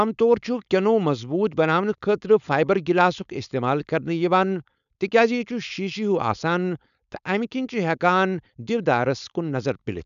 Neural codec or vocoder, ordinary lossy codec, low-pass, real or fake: codec, 16 kHz, 4.8 kbps, FACodec; none; 7.2 kHz; fake